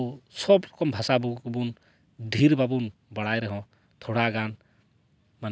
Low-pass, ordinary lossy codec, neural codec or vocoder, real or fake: none; none; none; real